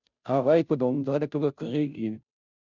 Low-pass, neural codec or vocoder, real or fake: 7.2 kHz; codec, 16 kHz, 0.5 kbps, FunCodec, trained on Chinese and English, 25 frames a second; fake